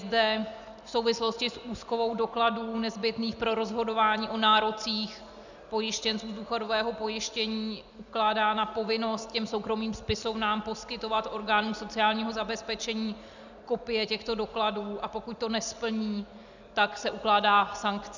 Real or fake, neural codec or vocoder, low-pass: real; none; 7.2 kHz